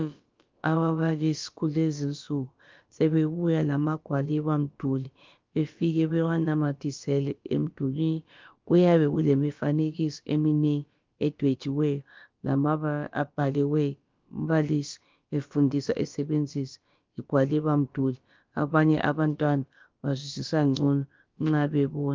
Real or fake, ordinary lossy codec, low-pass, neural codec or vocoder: fake; Opus, 24 kbps; 7.2 kHz; codec, 16 kHz, about 1 kbps, DyCAST, with the encoder's durations